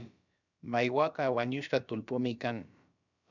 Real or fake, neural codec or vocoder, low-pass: fake; codec, 16 kHz, about 1 kbps, DyCAST, with the encoder's durations; 7.2 kHz